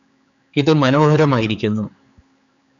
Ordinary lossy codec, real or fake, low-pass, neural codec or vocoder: MP3, 96 kbps; fake; 7.2 kHz; codec, 16 kHz, 4 kbps, X-Codec, HuBERT features, trained on general audio